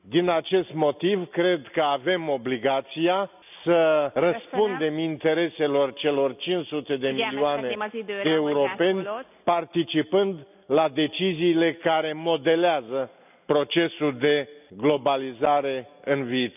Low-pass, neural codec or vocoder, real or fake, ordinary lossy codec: 3.6 kHz; none; real; none